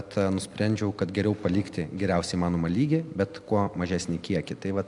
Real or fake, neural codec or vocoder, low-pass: real; none; 10.8 kHz